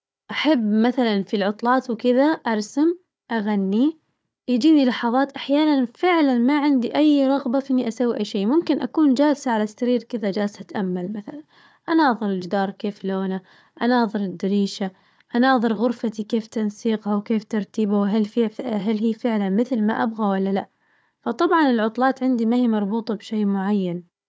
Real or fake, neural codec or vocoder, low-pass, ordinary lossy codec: fake; codec, 16 kHz, 4 kbps, FunCodec, trained on Chinese and English, 50 frames a second; none; none